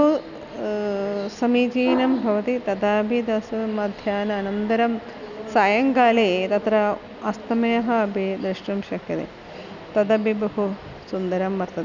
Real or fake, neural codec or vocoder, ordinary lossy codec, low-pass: real; none; none; 7.2 kHz